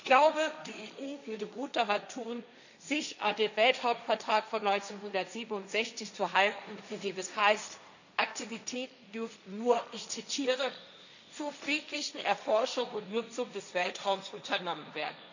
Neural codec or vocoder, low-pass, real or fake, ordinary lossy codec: codec, 16 kHz, 1.1 kbps, Voila-Tokenizer; 7.2 kHz; fake; none